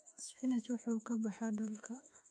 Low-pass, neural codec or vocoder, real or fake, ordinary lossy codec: 9.9 kHz; autoencoder, 48 kHz, 32 numbers a frame, DAC-VAE, trained on Japanese speech; fake; MP3, 48 kbps